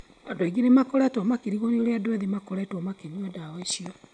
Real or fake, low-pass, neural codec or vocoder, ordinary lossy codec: real; 9.9 kHz; none; none